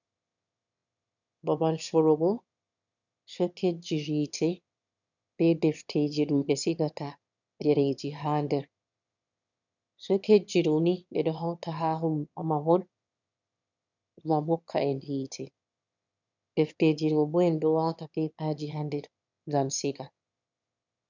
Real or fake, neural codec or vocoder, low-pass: fake; autoencoder, 22.05 kHz, a latent of 192 numbers a frame, VITS, trained on one speaker; 7.2 kHz